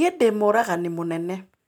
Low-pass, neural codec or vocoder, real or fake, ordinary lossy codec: none; none; real; none